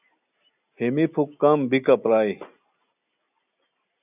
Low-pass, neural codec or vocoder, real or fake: 3.6 kHz; none; real